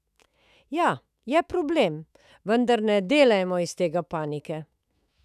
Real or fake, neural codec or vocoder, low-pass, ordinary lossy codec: fake; autoencoder, 48 kHz, 128 numbers a frame, DAC-VAE, trained on Japanese speech; 14.4 kHz; none